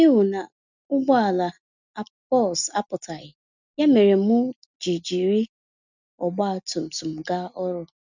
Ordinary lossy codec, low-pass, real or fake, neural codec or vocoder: none; 7.2 kHz; real; none